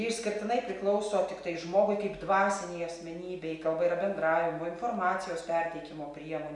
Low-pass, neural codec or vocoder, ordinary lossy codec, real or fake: 10.8 kHz; none; MP3, 96 kbps; real